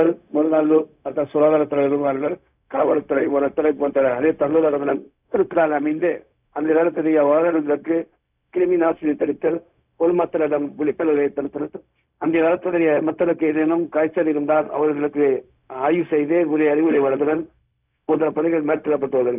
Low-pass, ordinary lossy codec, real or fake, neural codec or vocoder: 3.6 kHz; none; fake; codec, 16 kHz, 0.4 kbps, LongCat-Audio-Codec